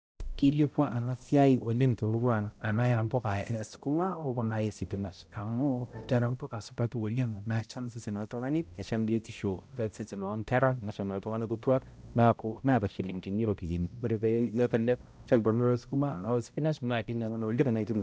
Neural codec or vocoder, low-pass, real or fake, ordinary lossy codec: codec, 16 kHz, 0.5 kbps, X-Codec, HuBERT features, trained on balanced general audio; none; fake; none